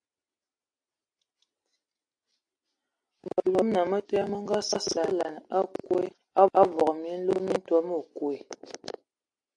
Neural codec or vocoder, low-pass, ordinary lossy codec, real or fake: none; 9.9 kHz; AAC, 64 kbps; real